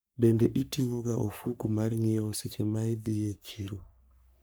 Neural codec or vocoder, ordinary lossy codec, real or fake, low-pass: codec, 44.1 kHz, 3.4 kbps, Pupu-Codec; none; fake; none